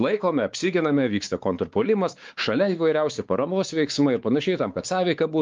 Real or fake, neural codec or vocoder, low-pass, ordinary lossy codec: fake; codec, 16 kHz, 4 kbps, X-Codec, WavLM features, trained on Multilingual LibriSpeech; 7.2 kHz; Opus, 32 kbps